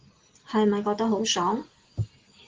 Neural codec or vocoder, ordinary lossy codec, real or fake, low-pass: none; Opus, 16 kbps; real; 7.2 kHz